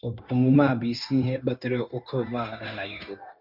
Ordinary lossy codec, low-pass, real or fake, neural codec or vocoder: MP3, 48 kbps; 5.4 kHz; fake; codec, 16 kHz, 0.9 kbps, LongCat-Audio-Codec